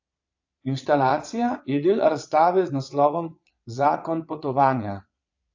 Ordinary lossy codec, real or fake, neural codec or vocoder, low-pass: AAC, 48 kbps; fake; vocoder, 22.05 kHz, 80 mel bands, Vocos; 7.2 kHz